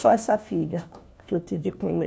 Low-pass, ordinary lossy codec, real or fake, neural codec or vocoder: none; none; fake; codec, 16 kHz, 1 kbps, FunCodec, trained on LibriTTS, 50 frames a second